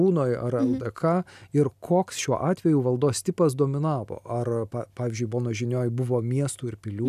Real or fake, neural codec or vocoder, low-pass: real; none; 14.4 kHz